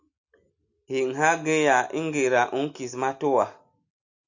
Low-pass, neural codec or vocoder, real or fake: 7.2 kHz; none; real